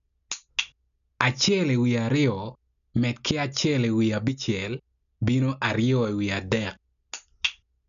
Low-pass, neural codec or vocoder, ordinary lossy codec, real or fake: 7.2 kHz; none; none; real